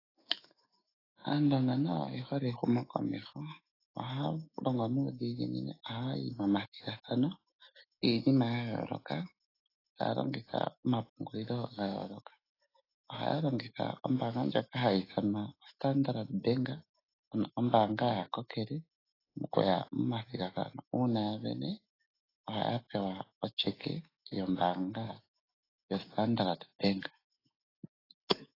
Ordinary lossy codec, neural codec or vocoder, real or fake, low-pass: AAC, 24 kbps; autoencoder, 48 kHz, 128 numbers a frame, DAC-VAE, trained on Japanese speech; fake; 5.4 kHz